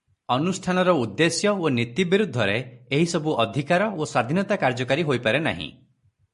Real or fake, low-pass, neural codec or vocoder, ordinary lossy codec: real; 14.4 kHz; none; MP3, 48 kbps